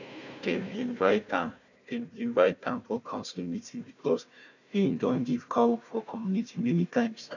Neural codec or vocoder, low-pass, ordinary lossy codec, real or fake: codec, 16 kHz, 1 kbps, FunCodec, trained on Chinese and English, 50 frames a second; 7.2 kHz; none; fake